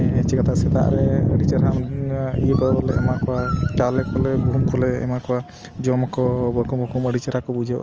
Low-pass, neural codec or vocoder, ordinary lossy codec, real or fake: 7.2 kHz; none; Opus, 24 kbps; real